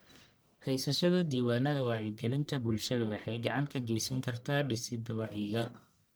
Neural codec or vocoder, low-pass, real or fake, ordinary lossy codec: codec, 44.1 kHz, 1.7 kbps, Pupu-Codec; none; fake; none